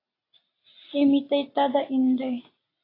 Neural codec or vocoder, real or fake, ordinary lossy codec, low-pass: none; real; AAC, 24 kbps; 5.4 kHz